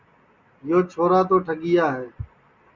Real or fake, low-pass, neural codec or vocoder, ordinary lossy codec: real; 7.2 kHz; none; Opus, 64 kbps